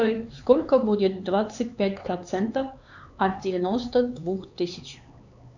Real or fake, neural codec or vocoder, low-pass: fake; codec, 16 kHz, 4 kbps, X-Codec, HuBERT features, trained on LibriSpeech; 7.2 kHz